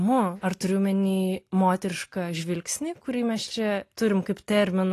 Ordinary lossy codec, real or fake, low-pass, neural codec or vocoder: AAC, 48 kbps; real; 14.4 kHz; none